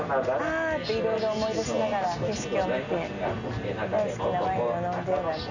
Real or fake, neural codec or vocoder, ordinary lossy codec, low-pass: real; none; none; 7.2 kHz